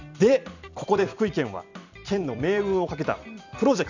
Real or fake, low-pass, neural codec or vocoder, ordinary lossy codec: fake; 7.2 kHz; vocoder, 44.1 kHz, 128 mel bands every 256 samples, BigVGAN v2; none